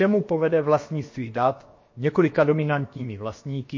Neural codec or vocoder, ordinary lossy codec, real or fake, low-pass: codec, 16 kHz, about 1 kbps, DyCAST, with the encoder's durations; MP3, 32 kbps; fake; 7.2 kHz